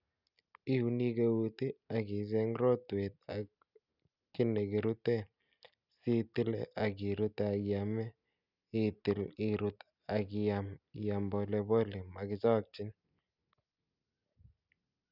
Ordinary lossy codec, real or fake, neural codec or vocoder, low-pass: none; real; none; 5.4 kHz